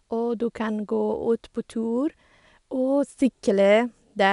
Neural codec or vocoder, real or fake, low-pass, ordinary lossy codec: none; real; 10.8 kHz; none